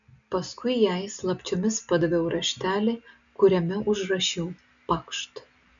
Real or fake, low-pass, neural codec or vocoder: real; 7.2 kHz; none